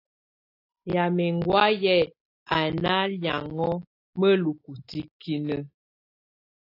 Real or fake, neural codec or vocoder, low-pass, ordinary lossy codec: real; none; 5.4 kHz; AAC, 32 kbps